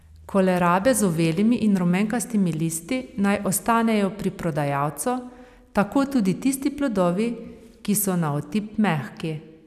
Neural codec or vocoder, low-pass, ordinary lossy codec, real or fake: none; 14.4 kHz; none; real